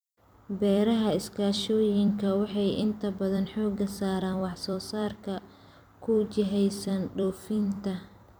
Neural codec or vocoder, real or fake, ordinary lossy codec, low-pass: vocoder, 44.1 kHz, 128 mel bands every 256 samples, BigVGAN v2; fake; none; none